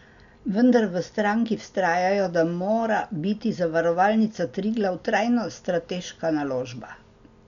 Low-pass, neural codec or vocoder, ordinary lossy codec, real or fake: 7.2 kHz; none; none; real